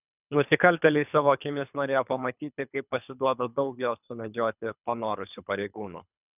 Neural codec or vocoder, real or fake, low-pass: codec, 24 kHz, 3 kbps, HILCodec; fake; 3.6 kHz